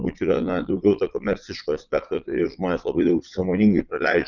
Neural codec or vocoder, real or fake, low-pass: vocoder, 22.05 kHz, 80 mel bands, Vocos; fake; 7.2 kHz